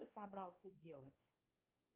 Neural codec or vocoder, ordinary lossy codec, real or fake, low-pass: codec, 16 kHz, 2 kbps, X-Codec, WavLM features, trained on Multilingual LibriSpeech; Opus, 24 kbps; fake; 3.6 kHz